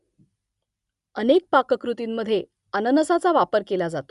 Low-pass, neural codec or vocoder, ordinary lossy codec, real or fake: 10.8 kHz; none; Opus, 64 kbps; real